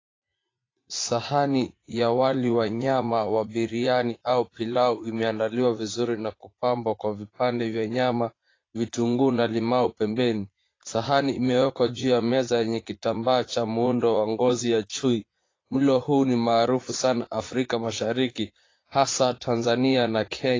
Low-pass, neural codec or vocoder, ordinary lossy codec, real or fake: 7.2 kHz; vocoder, 44.1 kHz, 80 mel bands, Vocos; AAC, 32 kbps; fake